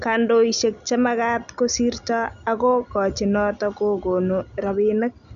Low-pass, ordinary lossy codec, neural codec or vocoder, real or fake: 7.2 kHz; none; none; real